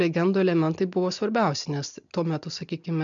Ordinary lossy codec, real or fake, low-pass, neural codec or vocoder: MP3, 64 kbps; real; 7.2 kHz; none